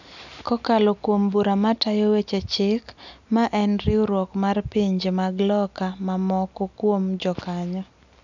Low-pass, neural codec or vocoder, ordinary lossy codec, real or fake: 7.2 kHz; none; none; real